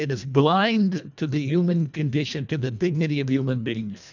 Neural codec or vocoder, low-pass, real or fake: codec, 24 kHz, 1.5 kbps, HILCodec; 7.2 kHz; fake